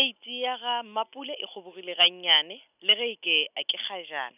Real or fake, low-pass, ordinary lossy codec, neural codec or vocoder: real; 3.6 kHz; none; none